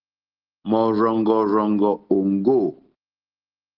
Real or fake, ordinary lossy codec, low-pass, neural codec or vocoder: real; Opus, 16 kbps; 5.4 kHz; none